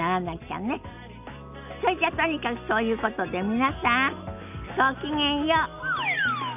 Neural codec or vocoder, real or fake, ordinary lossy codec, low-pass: none; real; none; 3.6 kHz